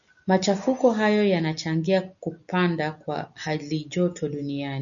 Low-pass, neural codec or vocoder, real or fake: 7.2 kHz; none; real